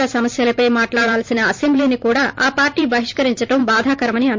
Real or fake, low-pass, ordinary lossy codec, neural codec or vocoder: fake; 7.2 kHz; MP3, 48 kbps; vocoder, 44.1 kHz, 128 mel bands every 512 samples, BigVGAN v2